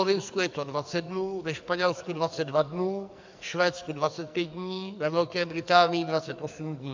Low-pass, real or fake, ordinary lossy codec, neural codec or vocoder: 7.2 kHz; fake; MP3, 64 kbps; codec, 44.1 kHz, 2.6 kbps, SNAC